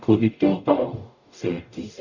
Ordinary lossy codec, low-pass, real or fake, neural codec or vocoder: none; 7.2 kHz; fake; codec, 44.1 kHz, 0.9 kbps, DAC